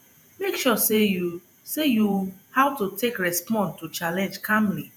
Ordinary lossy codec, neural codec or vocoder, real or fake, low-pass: none; vocoder, 48 kHz, 128 mel bands, Vocos; fake; none